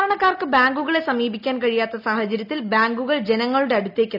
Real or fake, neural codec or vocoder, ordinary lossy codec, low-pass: real; none; none; 5.4 kHz